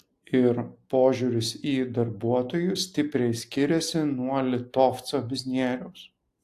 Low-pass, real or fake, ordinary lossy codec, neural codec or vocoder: 14.4 kHz; real; AAC, 64 kbps; none